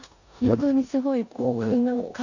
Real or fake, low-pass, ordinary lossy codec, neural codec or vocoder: fake; 7.2 kHz; none; codec, 16 kHz in and 24 kHz out, 0.9 kbps, LongCat-Audio-Codec, four codebook decoder